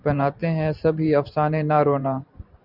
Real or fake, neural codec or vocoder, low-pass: fake; vocoder, 44.1 kHz, 128 mel bands every 512 samples, BigVGAN v2; 5.4 kHz